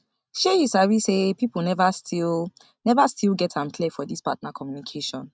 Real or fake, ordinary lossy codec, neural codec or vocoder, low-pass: real; none; none; none